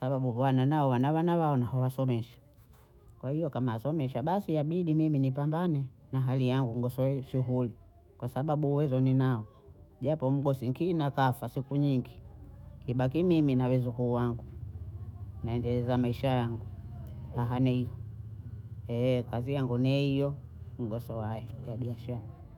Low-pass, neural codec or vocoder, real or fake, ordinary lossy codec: 19.8 kHz; autoencoder, 48 kHz, 128 numbers a frame, DAC-VAE, trained on Japanese speech; fake; none